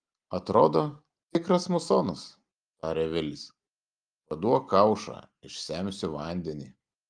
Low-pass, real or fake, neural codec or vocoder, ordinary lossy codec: 9.9 kHz; real; none; Opus, 32 kbps